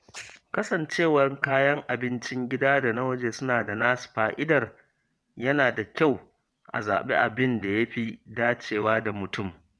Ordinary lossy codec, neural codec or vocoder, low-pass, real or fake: none; vocoder, 22.05 kHz, 80 mel bands, WaveNeXt; none; fake